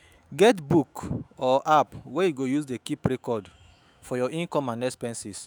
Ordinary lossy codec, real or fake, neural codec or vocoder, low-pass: none; fake; autoencoder, 48 kHz, 128 numbers a frame, DAC-VAE, trained on Japanese speech; none